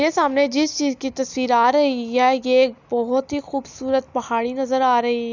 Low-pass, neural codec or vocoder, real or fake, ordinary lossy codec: 7.2 kHz; none; real; none